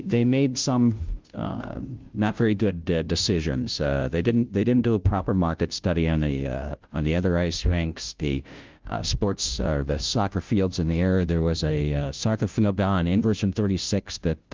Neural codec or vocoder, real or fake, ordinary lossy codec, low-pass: codec, 16 kHz, 0.5 kbps, FunCodec, trained on Chinese and English, 25 frames a second; fake; Opus, 32 kbps; 7.2 kHz